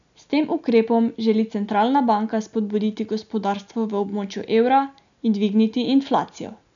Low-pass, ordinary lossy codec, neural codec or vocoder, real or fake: 7.2 kHz; none; none; real